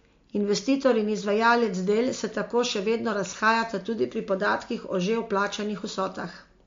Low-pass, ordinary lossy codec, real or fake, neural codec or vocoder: 7.2 kHz; MP3, 48 kbps; real; none